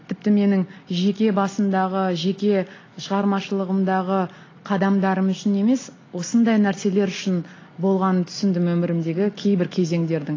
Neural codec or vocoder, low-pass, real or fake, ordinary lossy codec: none; 7.2 kHz; real; AAC, 32 kbps